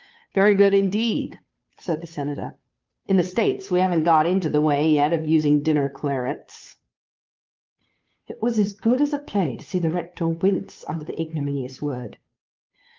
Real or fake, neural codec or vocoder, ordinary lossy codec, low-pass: fake; codec, 16 kHz, 2 kbps, FunCodec, trained on Chinese and English, 25 frames a second; Opus, 24 kbps; 7.2 kHz